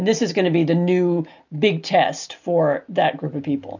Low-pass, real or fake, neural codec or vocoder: 7.2 kHz; real; none